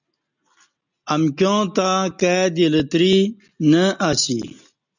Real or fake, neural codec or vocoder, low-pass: real; none; 7.2 kHz